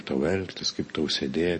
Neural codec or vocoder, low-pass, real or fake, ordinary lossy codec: none; 10.8 kHz; real; MP3, 32 kbps